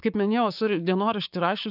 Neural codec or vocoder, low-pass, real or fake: codec, 16 kHz, 6 kbps, DAC; 5.4 kHz; fake